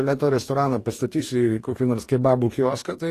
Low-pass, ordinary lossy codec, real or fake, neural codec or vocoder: 14.4 kHz; AAC, 48 kbps; fake; codec, 44.1 kHz, 2.6 kbps, DAC